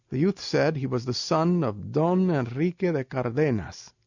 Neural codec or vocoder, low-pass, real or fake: none; 7.2 kHz; real